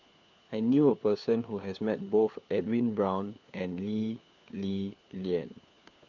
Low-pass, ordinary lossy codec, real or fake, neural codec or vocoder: 7.2 kHz; none; fake; codec, 16 kHz, 4 kbps, FunCodec, trained on LibriTTS, 50 frames a second